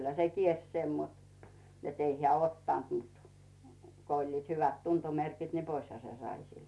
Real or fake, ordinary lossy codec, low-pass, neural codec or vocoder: real; none; none; none